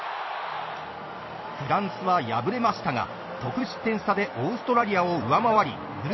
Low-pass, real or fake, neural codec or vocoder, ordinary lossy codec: 7.2 kHz; fake; vocoder, 44.1 kHz, 128 mel bands every 256 samples, BigVGAN v2; MP3, 24 kbps